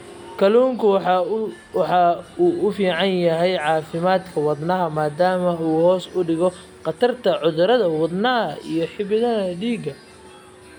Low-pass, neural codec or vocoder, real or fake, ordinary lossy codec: 19.8 kHz; none; real; none